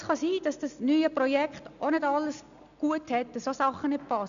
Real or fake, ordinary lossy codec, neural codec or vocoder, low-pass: real; none; none; 7.2 kHz